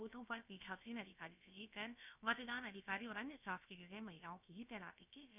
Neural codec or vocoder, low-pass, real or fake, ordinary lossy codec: codec, 16 kHz, about 1 kbps, DyCAST, with the encoder's durations; 3.6 kHz; fake; none